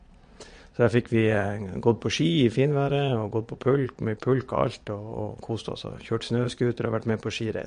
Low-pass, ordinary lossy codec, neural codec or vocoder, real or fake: 9.9 kHz; MP3, 48 kbps; vocoder, 22.05 kHz, 80 mel bands, Vocos; fake